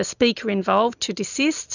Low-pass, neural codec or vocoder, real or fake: 7.2 kHz; none; real